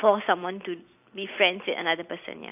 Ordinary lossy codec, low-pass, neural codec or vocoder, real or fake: AAC, 32 kbps; 3.6 kHz; vocoder, 44.1 kHz, 128 mel bands every 256 samples, BigVGAN v2; fake